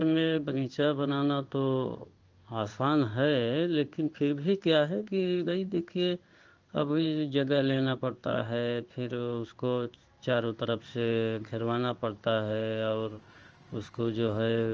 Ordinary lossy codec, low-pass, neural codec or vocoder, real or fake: Opus, 24 kbps; 7.2 kHz; codec, 16 kHz in and 24 kHz out, 1 kbps, XY-Tokenizer; fake